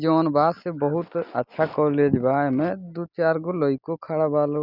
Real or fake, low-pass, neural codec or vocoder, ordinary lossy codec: real; 5.4 kHz; none; none